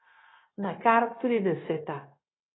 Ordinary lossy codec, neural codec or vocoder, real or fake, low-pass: AAC, 16 kbps; codec, 16 kHz, 0.9 kbps, LongCat-Audio-Codec; fake; 7.2 kHz